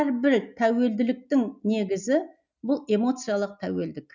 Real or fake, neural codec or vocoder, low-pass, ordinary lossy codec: real; none; 7.2 kHz; none